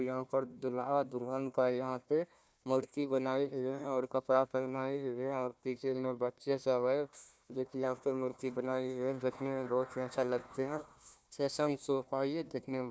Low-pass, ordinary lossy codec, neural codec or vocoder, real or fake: none; none; codec, 16 kHz, 1 kbps, FunCodec, trained on Chinese and English, 50 frames a second; fake